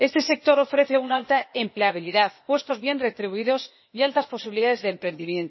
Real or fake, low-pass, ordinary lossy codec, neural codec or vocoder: fake; 7.2 kHz; MP3, 24 kbps; codec, 16 kHz, 0.8 kbps, ZipCodec